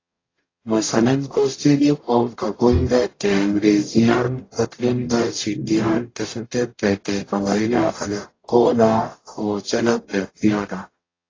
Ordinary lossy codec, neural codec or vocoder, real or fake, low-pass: AAC, 32 kbps; codec, 44.1 kHz, 0.9 kbps, DAC; fake; 7.2 kHz